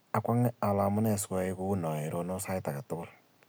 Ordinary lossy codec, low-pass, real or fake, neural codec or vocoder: none; none; real; none